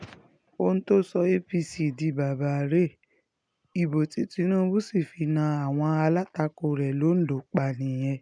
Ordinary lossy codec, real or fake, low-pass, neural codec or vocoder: none; real; 9.9 kHz; none